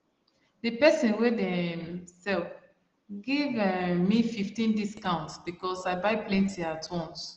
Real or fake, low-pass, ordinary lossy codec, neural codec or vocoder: real; 7.2 kHz; Opus, 16 kbps; none